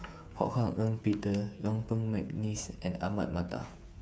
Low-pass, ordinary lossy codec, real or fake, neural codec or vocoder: none; none; fake; codec, 16 kHz, 8 kbps, FreqCodec, smaller model